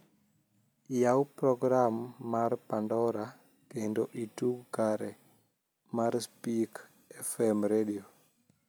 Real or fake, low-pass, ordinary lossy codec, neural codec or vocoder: real; none; none; none